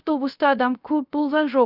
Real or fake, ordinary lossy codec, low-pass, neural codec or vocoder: fake; none; 5.4 kHz; codec, 16 kHz, 0.3 kbps, FocalCodec